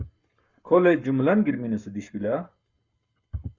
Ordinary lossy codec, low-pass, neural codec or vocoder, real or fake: Opus, 64 kbps; 7.2 kHz; codec, 44.1 kHz, 7.8 kbps, Pupu-Codec; fake